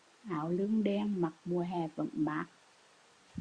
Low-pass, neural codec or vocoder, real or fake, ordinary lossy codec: 9.9 kHz; vocoder, 44.1 kHz, 128 mel bands every 256 samples, BigVGAN v2; fake; Opus, 64 kbps